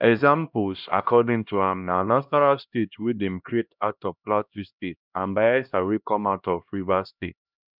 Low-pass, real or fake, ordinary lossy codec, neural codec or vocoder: 5.4 kHz; fake; none; codec, 16 kHz, 1 kbps, X-Codec, HuBERT features, trained on LibriSpeech